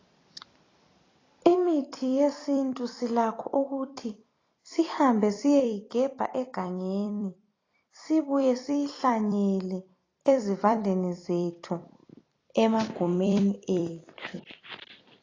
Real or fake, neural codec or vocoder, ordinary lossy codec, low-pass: fake; vocoder, 44.1 kHz, 128 mel bands every 256 samples, BigVGAN v2; AAC, 32 kbps; 7.2 kHz